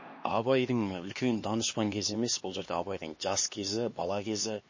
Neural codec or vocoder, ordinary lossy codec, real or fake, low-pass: codec, 16 kHz, 2 kbps, X-Codec, HuBERT features, trained on LibriSpeech; MP3, 32 kbps; fake; 7.2 kHz